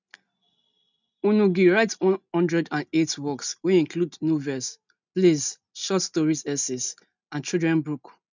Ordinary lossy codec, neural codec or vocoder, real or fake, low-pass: none; none; real; 7.2 kHz